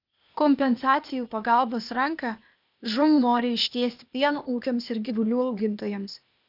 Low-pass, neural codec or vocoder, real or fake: 5.4 kHz; codec, 16 kHz, 0.8 kbps, ZipCodec; fake